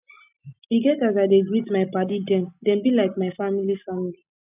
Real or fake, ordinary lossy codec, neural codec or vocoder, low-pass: real; none; none; 3.6 kHz